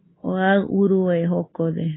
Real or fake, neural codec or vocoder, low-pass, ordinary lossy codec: real; none; 7.2 kHz; AAC, 16 kbps